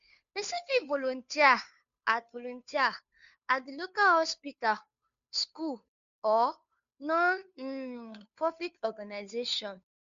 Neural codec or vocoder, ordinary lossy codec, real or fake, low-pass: codec, 16 kHz, 2 kbps, FunCodec, trained on Chinese and English, 25 frames a second; MP3, 64 kbps; fake; 7.2 kHz